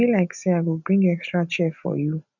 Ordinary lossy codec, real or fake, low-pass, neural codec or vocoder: none; real; 7.2 kHz; none